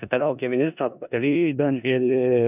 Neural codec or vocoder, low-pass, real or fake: codec, 16 kHz in and 24 kHz out, 0.4 kbps, LongCat-Audio-Codec, four codebook decoder; 3.6 kHz; fake